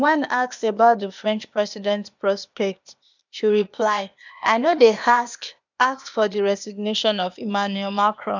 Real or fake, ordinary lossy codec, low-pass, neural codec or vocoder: fake; none; 7.2 kHz; codec, 16 kHz, 0.8 kbps, ZipCodec